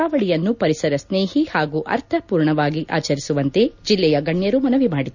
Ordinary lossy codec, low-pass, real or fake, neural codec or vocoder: none; 7.2 kHz; real; none